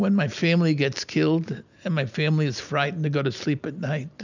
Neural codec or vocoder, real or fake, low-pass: none; real; 7.2 kHz